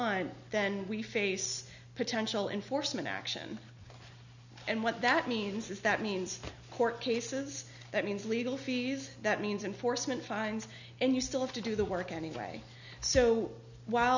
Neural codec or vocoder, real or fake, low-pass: none; real; 7.2 kHz